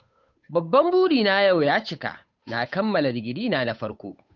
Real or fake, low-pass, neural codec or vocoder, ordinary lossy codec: fake; 7.2 kHz; codec, 16 kHz, 8 kbps, FunCodec, trained on Chinese and English, 25 frames a second; none